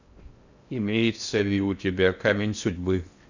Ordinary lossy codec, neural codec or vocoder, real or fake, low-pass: Opus, 64 kbps; codec, 16 kHz in and 24 kHz out, 0.6 kbps, FocalCodec, streaming, 4096 codes; fake; 7.2 kHz